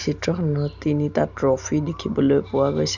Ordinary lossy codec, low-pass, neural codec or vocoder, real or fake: none; 7.2 kHz; none; real